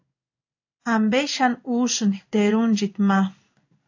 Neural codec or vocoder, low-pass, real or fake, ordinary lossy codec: codec, 16 kHz in and 24 kHz out, 1 kbps, XY-Tokenizer; 7.2 kHz; fake; AAC, 48 kbps